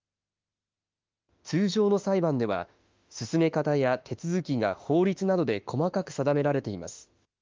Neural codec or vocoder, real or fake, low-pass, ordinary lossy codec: autoencoder, 48 kHz, 32 numbers a frame, DAC-VAE, trained on Japanese speech; fake; 7.2 kHz; Opus, 24 kbps